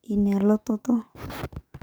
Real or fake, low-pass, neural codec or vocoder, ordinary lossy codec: fake; none; codec, 44.1 kHz, 7.8 kbps, DAC; none